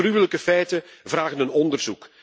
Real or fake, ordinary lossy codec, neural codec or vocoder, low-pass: real; none; none; none